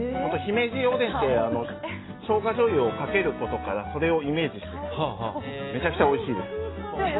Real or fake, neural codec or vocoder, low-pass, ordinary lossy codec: real; none; 7.2 kHz; AAC, 16 kbps